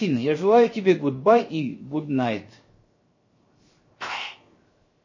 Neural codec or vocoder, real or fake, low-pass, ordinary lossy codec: codec, 16 kHz, 0.7 kbps, FocalCodec; fake; 7.2 kHz; MP3, 32 kbps